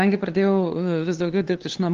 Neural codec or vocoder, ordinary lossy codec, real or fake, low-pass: codec, 16 kHz, 2 kbps, FunCodec, trained on LibriTTS, 25 frames a second; Opus, 16 kbps; fake; 7.2 kHz